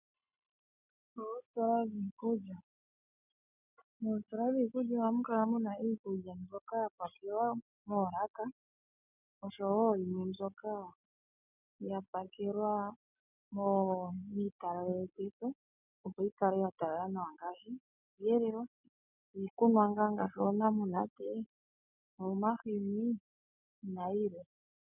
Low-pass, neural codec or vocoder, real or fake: 3.6 kHz; none; real